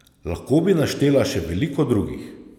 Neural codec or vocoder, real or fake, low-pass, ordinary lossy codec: none; real; 19.8 kHz; none